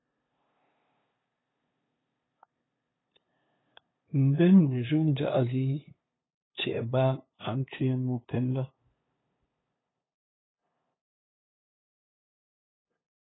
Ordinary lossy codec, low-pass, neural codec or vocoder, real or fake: AAC, 16 kbps; 7.2 kHz; codec, 16 kHz, 2 kbps, FunCodec, trained on LibriTTS, 25 frames a second; fake